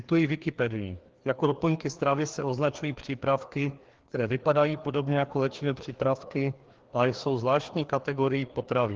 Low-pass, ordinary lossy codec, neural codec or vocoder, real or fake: 7.2 kHz; Opus, 16 kbps; codec, 16 kHz, 2 kbps, FreqCodec, larger model; fake